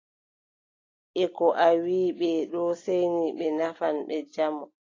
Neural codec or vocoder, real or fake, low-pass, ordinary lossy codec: none; real; 7.2 kHz; AAC, 32 kbps